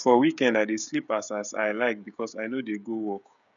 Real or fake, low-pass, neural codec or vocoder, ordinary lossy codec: fake; 7.2 kHz; codec, 16 kHz, 16 kbps, FreqCodec, smaller model; none